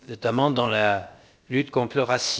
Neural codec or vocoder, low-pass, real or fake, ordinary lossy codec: codec, 16 kHz, about 1 kbps, DyCAST, with the encoder's durations; none; fake; none